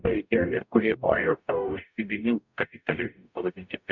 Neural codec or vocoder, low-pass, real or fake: codec, 44.1 kHz, 0.9 kbps, DAC; 7.2 kHz; fake